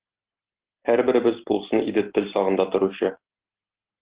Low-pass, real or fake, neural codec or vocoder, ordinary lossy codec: 3.6 kHz; real; none; Opus, 16 kbps